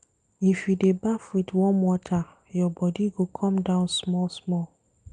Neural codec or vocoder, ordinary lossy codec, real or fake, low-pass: none; Opus, 32 kbps; real; 9.9 kHz